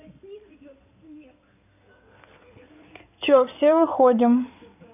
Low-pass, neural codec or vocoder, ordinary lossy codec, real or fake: 3.6 kHz; codec, 16 kHz in and 24 kHz out, 2.2 kbps, FireRedTTS-2 codec; none; fake